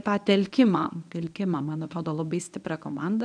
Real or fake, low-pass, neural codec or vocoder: fake; 9.9 kHz; codec, 24 kHz, 0.9 kbps, WavTokenizer, medium speech release version 1